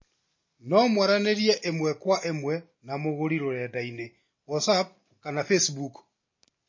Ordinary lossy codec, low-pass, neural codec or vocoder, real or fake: MP3, 32 kbps; 7.2 kHz; none; real